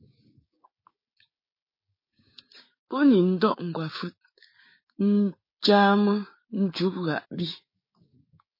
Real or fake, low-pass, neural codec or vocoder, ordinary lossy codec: fake; 5.4 kHz; codec, 16 kHz, 6 kbps, DAC; MP3, 24 kbps